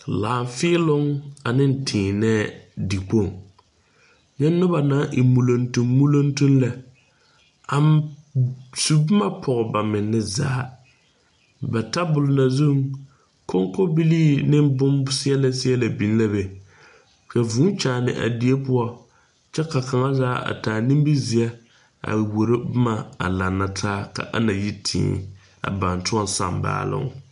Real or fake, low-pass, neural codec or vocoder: real; 10.8 kHz; none